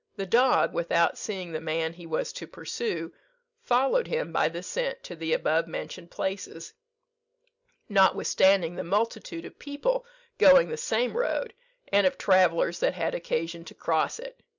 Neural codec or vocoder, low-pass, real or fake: none; 7.2 kHz; real